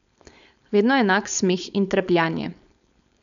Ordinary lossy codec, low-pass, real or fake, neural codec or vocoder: none; 7.2 kHz; fake; codec, 16 kHz, 4.8 kbps, FACodec